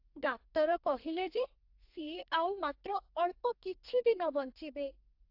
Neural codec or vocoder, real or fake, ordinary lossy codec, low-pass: codec, 32 kHz, 1.9 kbps, SNAC; fake; AAC, 48 kbps; 5.4 kHz